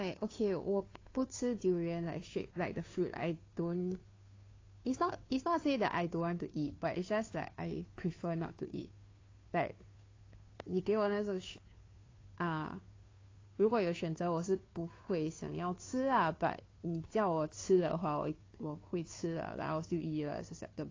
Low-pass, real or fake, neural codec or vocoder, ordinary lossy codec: 7.2 kHz; fake; codec, 16 kHz, 2 kbps, FunCodec, trained on Chinese and English, 25 frames a second; AAC, 32 kbps